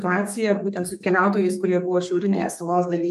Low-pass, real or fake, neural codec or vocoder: 14.4 kHz; fake; codec, 32 kHz, 1.9 kbps, SNAC